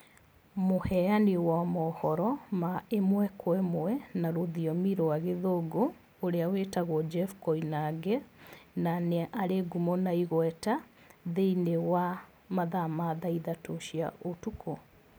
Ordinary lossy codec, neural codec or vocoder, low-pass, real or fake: none; none; none; real